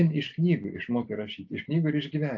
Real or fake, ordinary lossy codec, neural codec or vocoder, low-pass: real; AAC, 48 kbps; none; 7.2 kHz